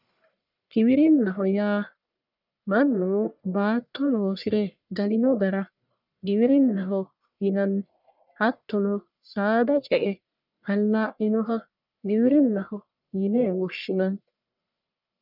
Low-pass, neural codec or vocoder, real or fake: 5.4 kHz; codec, 44.1 kHz, 1.7 kbps, Pupu-Codec; fake